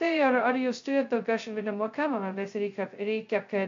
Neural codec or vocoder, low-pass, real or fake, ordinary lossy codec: codec, 16 kHz, 0.2 kbps, FocalCodec; 7.2 kHz; fake; AAC, 48 kbps